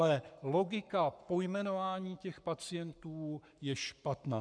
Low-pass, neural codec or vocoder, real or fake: 9.9 kHz; codec, 44.1 kHz, 7.8 kbps, Pupu-Codec; fake